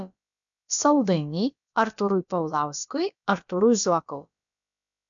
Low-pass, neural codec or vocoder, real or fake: 7.2 kHz; codec, 16 kHz, about 1 kbps, DyCAST, with the encoder's durations; fake